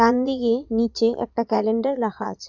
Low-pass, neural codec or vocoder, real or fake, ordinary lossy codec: 7.2 kHz; vocoder, 22.05 kHz, 80 mel bands, Vocos; fake; none